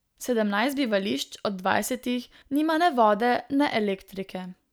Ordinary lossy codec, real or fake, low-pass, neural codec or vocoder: none; real; none; none